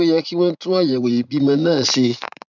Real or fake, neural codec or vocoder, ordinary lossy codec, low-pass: fake; vocoder, 44.1 kHz, 80 mel bands, Vocos; none; 7.2 kHz